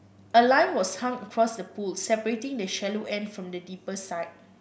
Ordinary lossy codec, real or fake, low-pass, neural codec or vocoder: none; real; none; none